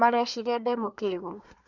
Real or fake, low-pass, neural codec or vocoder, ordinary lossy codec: fake; 7.2 kHz; codec, 24 kHz, 1 kbps, SNAC; none